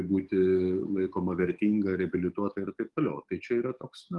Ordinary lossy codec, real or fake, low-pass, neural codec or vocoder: Opus, 24 kbps; fake; 10.8 kHz; codec, 44.1 kHz, 7.8 kbps, DAC